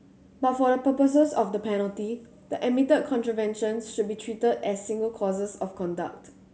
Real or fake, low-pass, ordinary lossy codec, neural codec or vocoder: real; none; none; none